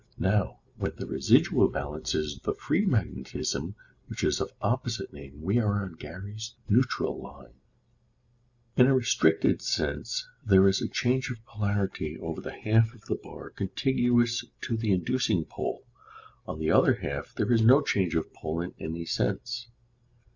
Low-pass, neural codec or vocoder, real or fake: 7.2 kHz; none; real